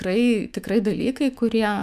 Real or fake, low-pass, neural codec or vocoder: fake; 14.4 kHz; autoencoder, 48 kHz, 128 numbers a frame, DAC-VAE, trained on Japanese speech